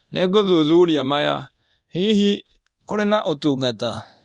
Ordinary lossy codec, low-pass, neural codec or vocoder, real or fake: Opus, 64 kbps; 10.8 kHz; codec, 24 kHz, 0.9 kbps, DualCodec; fake